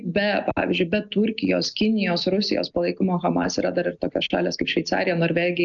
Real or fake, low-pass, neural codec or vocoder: real; 7.2 kHz; none